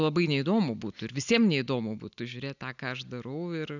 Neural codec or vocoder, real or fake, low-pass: none; real; 7.2 kHz